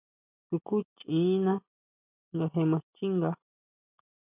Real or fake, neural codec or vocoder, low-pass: real; none; 3.6 kHz